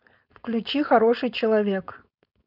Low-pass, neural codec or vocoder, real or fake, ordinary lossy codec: 5.4 kHz; codec, 16 kHz, 4.8 kbps, FACodec; fake; none